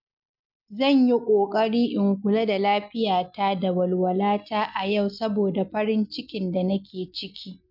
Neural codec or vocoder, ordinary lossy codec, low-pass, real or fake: none; none; 5.4 kHz; real